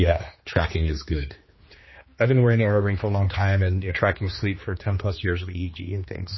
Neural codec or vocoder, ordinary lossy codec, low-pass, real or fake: codec, 16 kHz, 2 kbps, X-Codec, HuBERT features, trained on general audio; MP3, 24 kbps; 7.2 kHz; fake